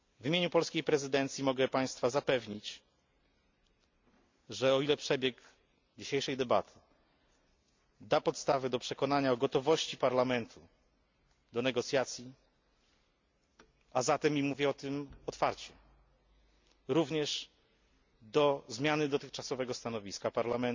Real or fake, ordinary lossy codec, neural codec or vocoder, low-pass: real; MP3, 64 kbps; none; 7.2 kHz